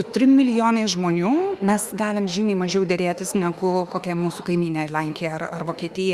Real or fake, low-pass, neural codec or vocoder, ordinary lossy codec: fake; 14.4 kHz; autoencoder, 48 kHz, 32 numbers a frame, DAC-VAE, trained on Japanese speech; Opus, 64 kbps